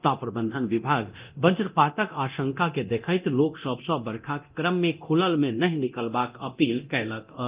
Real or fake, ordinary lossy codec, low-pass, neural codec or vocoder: fake; Opus, 32 kbps; 3.6 kHz; codec, 24 kHz, 0.9 kbps, DualCodec